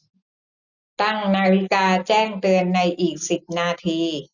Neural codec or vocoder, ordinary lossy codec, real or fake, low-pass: none; none; real; 7.2 kHz